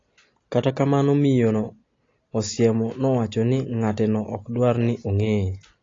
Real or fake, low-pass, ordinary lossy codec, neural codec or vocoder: real; 7.2 kHz; AAC, 32 kbps; none